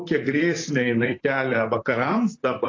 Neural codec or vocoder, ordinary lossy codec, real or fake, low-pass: vocoder, 44.1 kHz, 128 mel bands, Pupu-Vocoder; AAC, 32 kbps; fake; 7.2 kHz